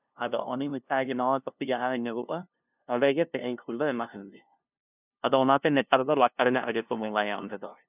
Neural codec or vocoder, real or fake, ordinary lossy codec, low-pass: codec, 16 kHz, 0.5 kbps, FunCodec, trained on LibriTTS, 25 frames a second; fake; none; 3.6 kHz